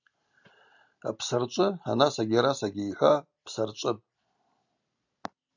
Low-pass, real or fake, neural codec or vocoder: 7.2 kHz; real; none